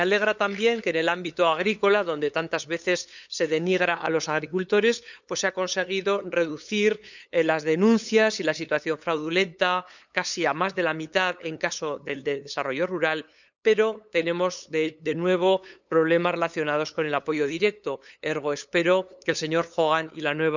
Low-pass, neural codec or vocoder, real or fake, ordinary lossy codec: 7.2 kHz; codec, 16 kHz, 8 kbps, FunCodec, trained on LibriTTS, 25 frames a second; fake; none